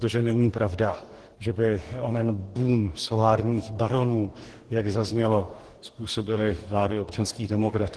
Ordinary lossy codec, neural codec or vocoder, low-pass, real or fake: Opus, 16 kbps; codec, 44.1 kHz, 2.6 kbps, DAC; 10.8 kHz; fake